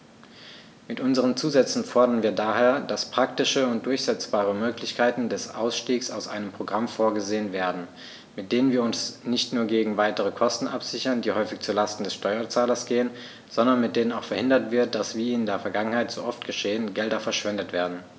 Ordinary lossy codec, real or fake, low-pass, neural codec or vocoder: none; real; none; none